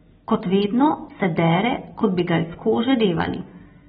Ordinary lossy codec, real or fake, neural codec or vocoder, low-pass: AAC, 16 kbps; real; none; 14.4 kHz